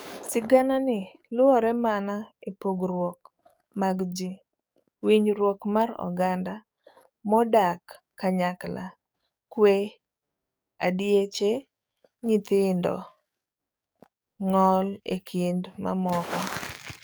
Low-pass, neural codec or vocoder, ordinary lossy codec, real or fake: none; codec, 44.1 kHz, 7.8 kbps, DAC; none; fake